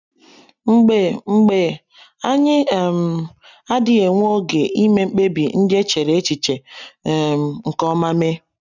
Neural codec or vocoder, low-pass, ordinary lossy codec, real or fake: none; 7.2 kHz; none; real